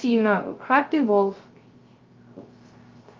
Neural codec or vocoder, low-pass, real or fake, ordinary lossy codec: codec, 16 kHz, 0.3 kbps, FocalCodec; 7.2 kHz; fake; Opus, 32 kbps